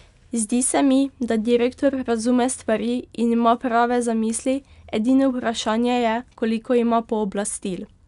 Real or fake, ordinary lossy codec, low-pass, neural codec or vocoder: real; none; 10.8 kHz; none